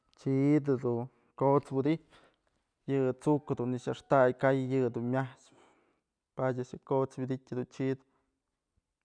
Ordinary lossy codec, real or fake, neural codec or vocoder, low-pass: none; real; none; 9.9 kHz